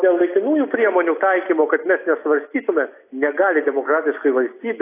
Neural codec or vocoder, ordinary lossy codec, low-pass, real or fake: none; AAC, 24 kbps; 3.6 kHz; real